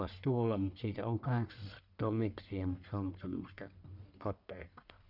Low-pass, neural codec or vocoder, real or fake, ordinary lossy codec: 5.4 kHz; codec, 44.1 kHz, 1.7 kbps, Pupu-Codec; fake; none